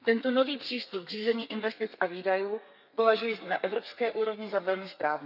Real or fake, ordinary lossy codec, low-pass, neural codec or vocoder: fake; none; 5.4 kHz; codec, 32 kHz, 1.9 kbps, SNAC